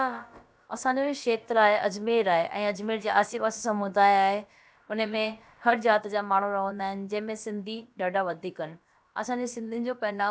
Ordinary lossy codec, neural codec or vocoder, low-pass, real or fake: none; codec, 16 kHz, about 1 kbps, DyCAST, with the encoder's durations; none; fake